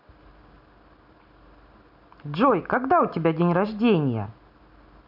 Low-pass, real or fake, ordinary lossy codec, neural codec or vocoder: 5.4 kHz; real; none; none